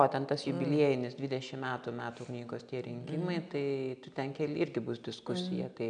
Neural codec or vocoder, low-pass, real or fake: none; 9.9 kHz; real